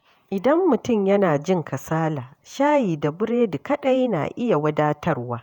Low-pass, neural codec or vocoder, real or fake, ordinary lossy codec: 19.8 kHz; vocoder, 48 kHz, 128 mel bands, Vocos; fake; none